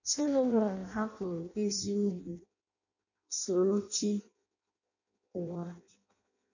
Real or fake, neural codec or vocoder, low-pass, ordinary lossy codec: fake; codec, 16 kHz in and 24 kHz out, 0.6 kbps, FireRedTTS-2 codec; 7.2 kHz; none